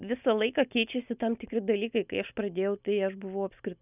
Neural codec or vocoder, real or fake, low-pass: none; real; 3.6 kHz